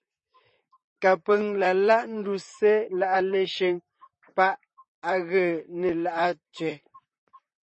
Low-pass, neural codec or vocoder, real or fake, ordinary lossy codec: 10.8 kHz; vocoder, 44.1 kHz, 128 mel bands, Pupu-Vocoder; fake; MP3, 32 kbps